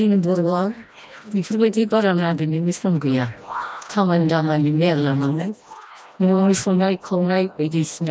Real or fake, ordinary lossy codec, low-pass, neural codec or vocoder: fake; none; none; codec, 16 kHz, 1 kbps, FreqCodec, smaller model